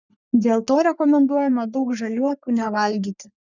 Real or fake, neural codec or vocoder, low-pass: fake; codec, 44.1 kHz, 3.4 kbps, Pupu-Codec; 7.2 kHz